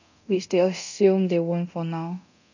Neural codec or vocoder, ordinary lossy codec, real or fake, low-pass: codec, 24 kHz, 0.9 kbps, DualCodec; none; fake; 7.2 kHz